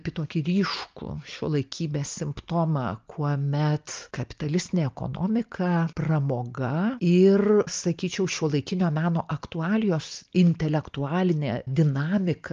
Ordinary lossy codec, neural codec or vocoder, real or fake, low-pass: Opus, 24 kbps; none; real; 7.2 kHz